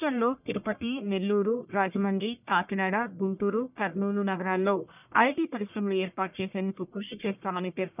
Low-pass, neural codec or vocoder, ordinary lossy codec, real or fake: 3.6 kHz; codec, 44.1 kHz, 1.7 kbps, Pupu-Codec; none; fake